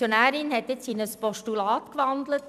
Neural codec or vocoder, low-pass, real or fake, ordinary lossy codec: vocoder, 44.1 kHz, 128 mel bands every 256 samples, BigVGAN v2; 14.4 kHz; fake; none